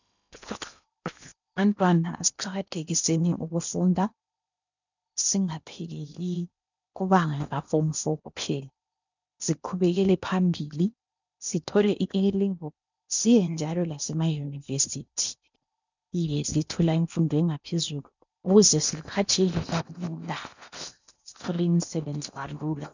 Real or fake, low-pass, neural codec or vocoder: fake; 7.2 kHz; codec, 16 kHz in and 24 kHz out, 0.8 kbps, FocalCodec, streaming, 65536 codes